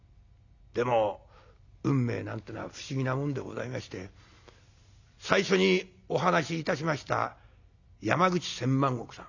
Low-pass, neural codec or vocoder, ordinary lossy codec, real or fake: 7.2 kHz; none; none; real